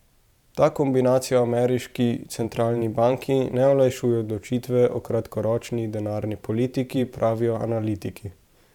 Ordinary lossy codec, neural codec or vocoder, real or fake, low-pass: none; vocoder, 44.1 kHz, 128 mel bands every 512 samples, BigVGAN v2; fake; 19.8 kHz